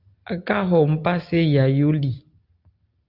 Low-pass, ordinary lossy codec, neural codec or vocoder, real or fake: 5.4 kHz; Opus, 24 kbps; none; real